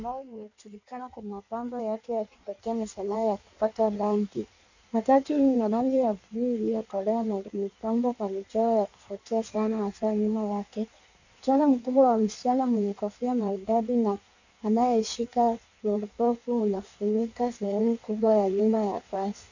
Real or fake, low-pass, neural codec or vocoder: fake; 7.2 kHz; codec, 16 kHz in and 24 kHz out, 1.1 kbps, FireRedTTS-2 codec